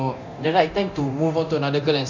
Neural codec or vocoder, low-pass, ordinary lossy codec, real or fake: codec, 24 kHz, 0.9 kbps, DualCodec; 7.2 kHz; none; fake